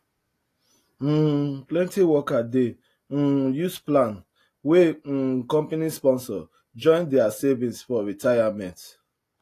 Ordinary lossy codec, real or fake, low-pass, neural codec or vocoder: AAC, 48 kbps; real; 14.4 kHz; none